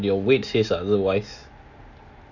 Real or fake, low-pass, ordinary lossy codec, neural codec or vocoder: real; 7.2 kHz; none; none